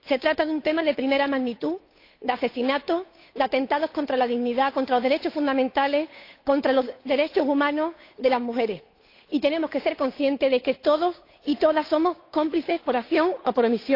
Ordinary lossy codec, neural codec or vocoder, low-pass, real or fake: AAC, 32 kbps; codec, 16 kHz, 8 kbps, FunCodec, trained on Chinese and English, 25 frames a second; 5.4 kHz; fake